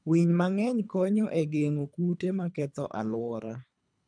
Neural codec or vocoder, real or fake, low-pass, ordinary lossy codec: codec, 24 kHz, 3 kbps, HILCodec; fake; 9.9 kHz; MP3, 96 kbps